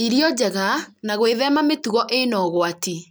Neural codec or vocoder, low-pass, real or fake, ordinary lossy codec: none; none; real; none